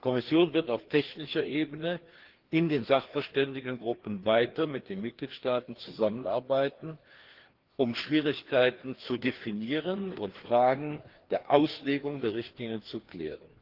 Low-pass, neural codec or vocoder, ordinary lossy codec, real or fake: 5.4 kHz; codec, 16 kHz, 2 kbps, FreqCodec, larger model; Opus, 16 kbps; fake